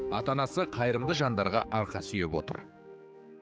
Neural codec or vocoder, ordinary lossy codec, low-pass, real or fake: codec, 16 kHz, 4 kbps, X-Codec, HuBERT features, trained on general audio; none; none; fake